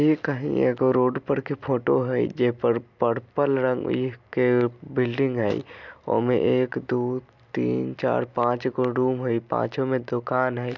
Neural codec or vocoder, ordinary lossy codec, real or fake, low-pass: none; none; real; 7.2 kHz